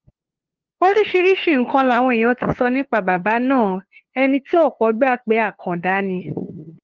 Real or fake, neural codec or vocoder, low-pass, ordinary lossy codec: fake; codec, 16 kHz, 2 kbps, FunCodec, trained on LibriTTS, 25 frames a second; 7.2 kHz; Opus, 16 kbps